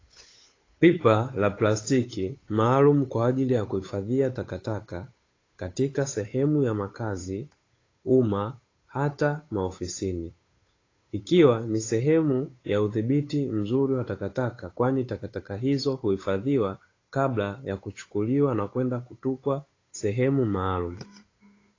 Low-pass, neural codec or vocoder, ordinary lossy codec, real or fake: 7.2 kHz; codec, 16 kHz, 8 kbps, FunCodec, trained on Chinese and English, 25 frames a second; AAC, 32 kbps; fake